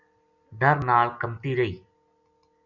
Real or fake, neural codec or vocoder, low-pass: real; none; 7.2 kHz